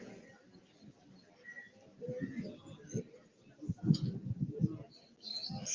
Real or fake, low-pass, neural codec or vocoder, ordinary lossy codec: real; 7.2 kHz; none; Opus, 24 kbps